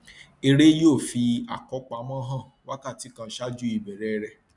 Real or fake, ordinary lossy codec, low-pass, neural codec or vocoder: real; none; 10.8 kHz; none